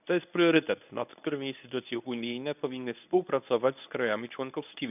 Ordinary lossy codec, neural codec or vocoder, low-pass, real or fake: none; codec, 24 kHz, 0.9 kbps, WavTokenizer, medium speech release version 1; 3.6 kHz; fake